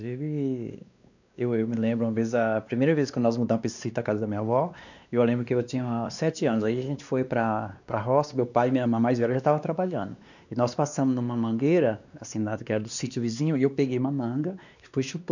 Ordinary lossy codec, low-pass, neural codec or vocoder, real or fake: none; 7.2 kHz; codec, 16 kHz, 2 kbps, X-Codec, WavLM features, trained on Multilingual LibriSpeech; fake